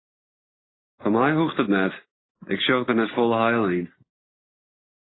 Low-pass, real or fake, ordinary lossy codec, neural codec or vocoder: 7.2 kHz; real; AAC, 16 kbps; none